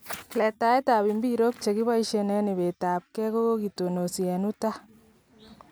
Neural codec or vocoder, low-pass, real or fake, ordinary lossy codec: none; none; real; none